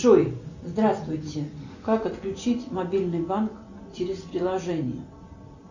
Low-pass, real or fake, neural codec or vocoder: 7.2 kHz; real; none